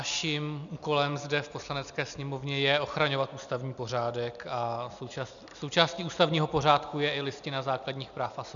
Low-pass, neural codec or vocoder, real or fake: 7.2 kHz; none; real